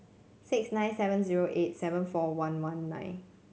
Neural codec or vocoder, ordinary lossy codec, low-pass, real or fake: none; none; none; real